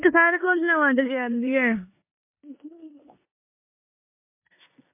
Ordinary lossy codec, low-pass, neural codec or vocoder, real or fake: MP3, 24 kbps; 3.6 kHz; codec, 16 kHz in and 24 kHz out, 0.9 kbps, LongCat-Audio-Codec, four codebook decoder; fake